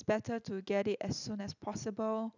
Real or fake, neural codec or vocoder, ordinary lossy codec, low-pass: real; none; none; 7.2 kHz